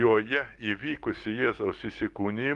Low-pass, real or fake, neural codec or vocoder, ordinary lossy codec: 10.8 kHz; fake; codec, 44.1 kHz, 7.8 kbps, DAC; Opus, 24 kbps